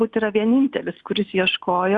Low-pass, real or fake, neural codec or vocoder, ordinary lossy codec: 10.8 kHz; real; none; Opus, 64 kbps